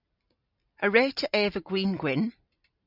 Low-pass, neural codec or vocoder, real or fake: 5.4 kHz; none; real